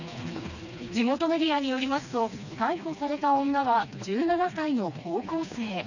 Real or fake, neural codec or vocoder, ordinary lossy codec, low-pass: fake; codec, 16 kHz, 2 kbps, FreqCodec, smaller model; none; 7.2 kHz